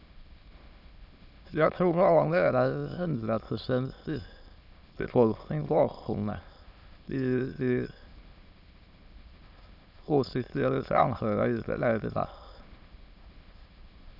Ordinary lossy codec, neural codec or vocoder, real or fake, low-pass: none; autoencoder, 22.05 kHz, a latent of 192 numbers a frame, VITS, trained on many speakers; fake; 5.4 kHz